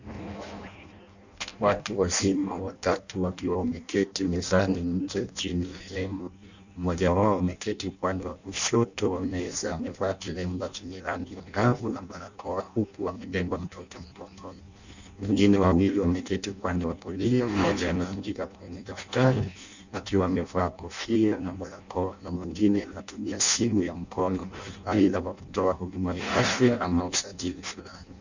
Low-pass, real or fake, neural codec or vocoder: 7.2 kHz; fake; codec, 16 kHz in and 24 kHz out, 0.6 kbps, FireRedTTS-2 codec